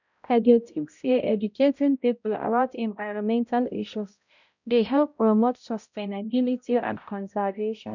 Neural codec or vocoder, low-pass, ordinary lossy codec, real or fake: codec, 16 kHz, 0.5 kbps, X-Codec, HuBERT features, trained on balanced general audio; 7.2 kHz; none; fake